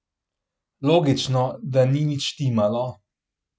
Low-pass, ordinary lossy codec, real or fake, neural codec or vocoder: none; none; real; none